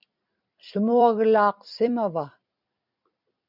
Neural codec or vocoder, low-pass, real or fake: vocoder, 44.1 kHz, 128 mel bands every 256 samples, BigVGAN v2; 5.4 kHz; fake